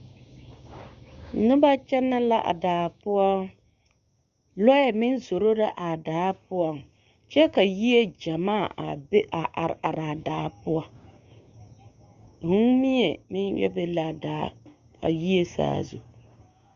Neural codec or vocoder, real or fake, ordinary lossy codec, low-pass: codec, 16 kHz, 6 kbps, DAC; fake; Opus, 64 kbps; 7.2 kHz